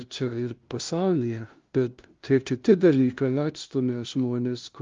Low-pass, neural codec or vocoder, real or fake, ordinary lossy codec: 7.2 kHz; codec, 16 kHz, 0.5 kbps, FunCodec, trained on LibriTTS, 25 frames a second; fake; Opus, 32 kbps